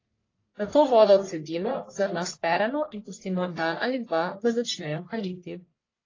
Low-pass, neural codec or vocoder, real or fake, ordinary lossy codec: 7.2 kHz; codec, 44.1 kHz, 1.7 kbps, Pupu-Codec; fake; AAC, 32 kbps